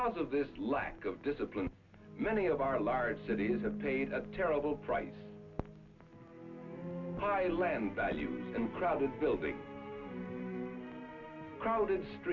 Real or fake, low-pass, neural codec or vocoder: real; 7.2 kHz; none